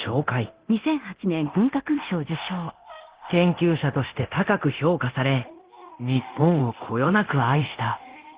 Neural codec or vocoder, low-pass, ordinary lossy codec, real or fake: codec, 24 kHz, 0.9 kbps, DualCodec; 3.6 kHz; Opus, 16 kbps; fake